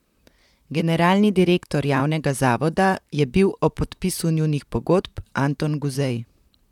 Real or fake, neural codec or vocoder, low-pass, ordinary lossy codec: fake; vocoder, 44.1 kHz, 128 mel bands, Pupu-Vocoder; 19.8 kHz; none